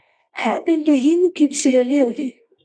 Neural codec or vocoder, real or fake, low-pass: codec, 24 kHz, 0.9 kbps, WavTokenizer, medium music audio release; fake; 9.9 kHz